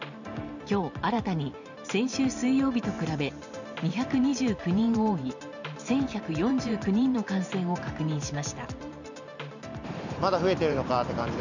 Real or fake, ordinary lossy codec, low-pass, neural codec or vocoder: fake; none; 7.2 kHz; vocoder, 44.1 kHz, 128 mel bands every 512 samples, BigVGAN v2